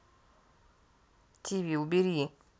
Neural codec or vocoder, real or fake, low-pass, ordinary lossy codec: none; real; none; none